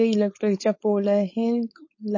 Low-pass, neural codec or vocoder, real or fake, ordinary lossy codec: 7.2 kHz; codec, 16 kHz, 4.8 kbps, FACodec; fake; MP3, 32 kbps